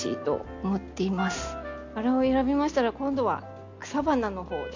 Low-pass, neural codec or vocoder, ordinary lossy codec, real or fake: 7.2 kHz; none; AAC, 48 kbps; real